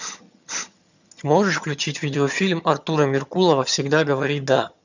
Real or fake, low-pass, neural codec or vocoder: fake; 7.2 kHz; vocoder, 22.05 kHz, 80 mel bands, HiFi-GAN